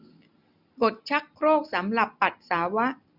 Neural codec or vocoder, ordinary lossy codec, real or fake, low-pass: none; none; real; 5.4 kHz